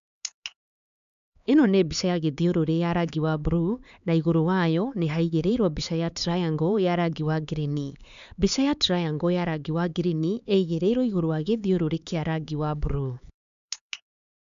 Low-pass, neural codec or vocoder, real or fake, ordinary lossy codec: 7.2 kHz; codec, 16 kHz, 4 kbps, X-Codec, HuBERT features, trained on LibriSpeech; fake; none